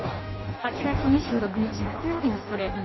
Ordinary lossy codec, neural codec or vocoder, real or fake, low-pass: MP3, 24 kbps; codec, 16 kHz in and 24 kHz out, 0.6 kbps, FireRedTTS-2 codec; fake; 7.2 kHz